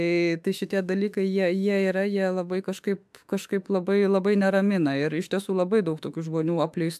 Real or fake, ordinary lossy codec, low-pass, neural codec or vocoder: fake; AAC, 96 kbps; 14.4 kHz; autoencoder, 48 kHz, 32 numbers a frame, DAC-VAE, trained on Japanese speech